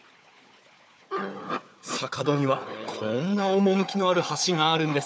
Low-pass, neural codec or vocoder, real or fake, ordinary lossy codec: none; codec, 16 kHz, 4 kbps, FunCodec, trained on Chinese and English, 50 frames a second; fake; none